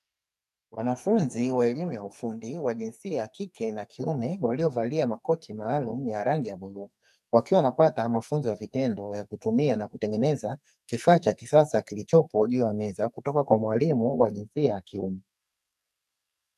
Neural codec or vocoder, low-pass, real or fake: codec, 44.1 kHz, 2.6 kbps, SNAC; 14.4 kHz; fake